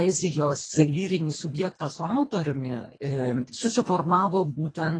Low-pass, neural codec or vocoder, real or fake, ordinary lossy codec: 9.9 kHz; codec, 24 kHz, 1.5 kbps, HILCodec; fake; AAC, 32 kbps